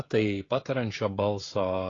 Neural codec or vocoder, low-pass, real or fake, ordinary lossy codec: codec, 16 kHz, 16 kbps, FreqCodec, smaller model; 7.2 kHz; fake; AAC, 32 kbps